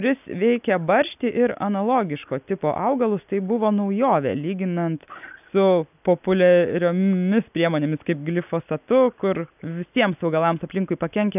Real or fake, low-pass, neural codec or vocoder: real; 3.6 kHz; none